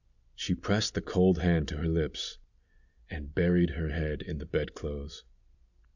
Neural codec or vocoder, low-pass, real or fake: none; 7.2 kHz; real